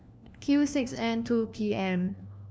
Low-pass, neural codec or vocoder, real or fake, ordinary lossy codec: none; codec, 16 kHz, 1 kbps, FunCodec, trained on LibriTTS, 50 frames a second; fake; none